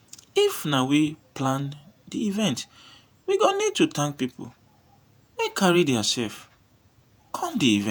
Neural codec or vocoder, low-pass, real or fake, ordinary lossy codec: vocoder, 48 kHz, 128 mel bands, Vocos; none; fake; none